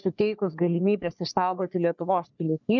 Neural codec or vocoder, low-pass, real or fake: codec, 44.1 kHz, 3.4 kbps, Pupu-Codec; 7.2 kHz; fake